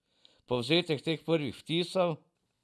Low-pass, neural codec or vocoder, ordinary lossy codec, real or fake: none; none; none; real